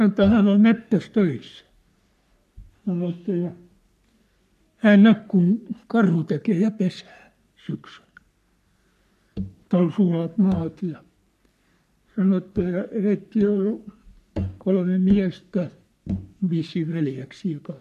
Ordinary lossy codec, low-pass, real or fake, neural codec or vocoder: none; 14.4 kHz; fake; codec, 44.1 kHz, 3.4 kbps, Pupu-Codec